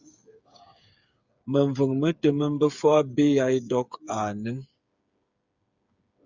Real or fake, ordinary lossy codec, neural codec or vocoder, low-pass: fake; Opus, 64 kbps; codec, 16 kHz, 8 kbps, FreqCodec, smaller model; 7.2 kHz